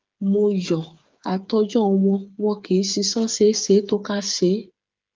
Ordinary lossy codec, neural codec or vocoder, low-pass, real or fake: Opus, 32 kbps; codec, 16 kHz, 8 kbps, FreqCodec, smaller model; 7.2 kHz; fake